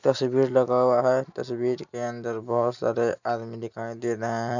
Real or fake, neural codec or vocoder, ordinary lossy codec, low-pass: real; none; none; 7.2 kHz